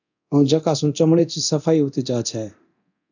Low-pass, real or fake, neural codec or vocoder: 7.2 kHz; fake; codec, 24 kHz, 0.9 kbps, DualCodec